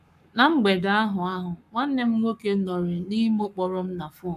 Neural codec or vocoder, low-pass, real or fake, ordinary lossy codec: codec, 44.1 kHz, 7.8 kbps, Pupu-Codec; 14.4 kHz; fake; AAC, 96 kbps